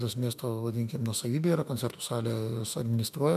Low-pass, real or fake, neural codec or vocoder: 14.4 kHz; fake; autoencoder, 48 kHz, 32 numbers a frame, DAC-VAE, trained on Japanese speech